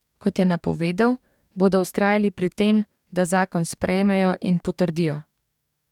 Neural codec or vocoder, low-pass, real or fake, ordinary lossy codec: codec, 44.1 kHz, 2.6 kbps, DAC; 19.8 kHz; fake; none